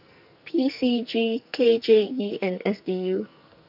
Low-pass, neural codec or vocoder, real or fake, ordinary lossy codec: 5.4 kHz; codec, 44.1 kHz, 2.6 kbps, SNAC; fake; none